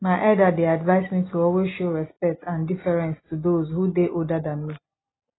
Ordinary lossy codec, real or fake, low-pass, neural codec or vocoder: AAC, 16 kbps; real; 7.2 kHz; none